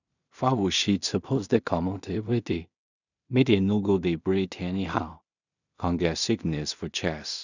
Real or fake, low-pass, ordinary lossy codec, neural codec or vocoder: fake; 7.2 kHz; none; codec, 16 kHz in and 24 kHz out, 0.4 kbps, LongCat-Audio-Codec, two codebook decoder